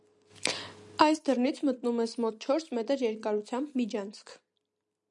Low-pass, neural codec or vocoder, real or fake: 10.8 kHz; none; real